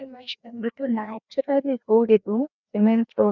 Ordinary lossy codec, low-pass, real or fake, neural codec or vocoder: none; 7.2 kHz; fake; codec, 16 kHz, 1 kbps, FreqCodec, larger model